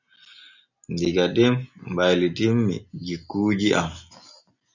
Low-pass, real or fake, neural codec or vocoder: 7.2 kHz; real; none